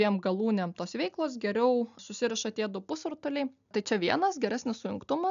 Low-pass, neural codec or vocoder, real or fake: 7.2 kHz; none; real